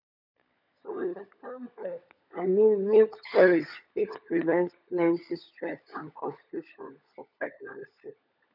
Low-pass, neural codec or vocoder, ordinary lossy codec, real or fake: 5.4 kHz; codec, 16 kHz, 16 kbps, FunCodec, trained on LibriTTS, 50 frames a second; none; fake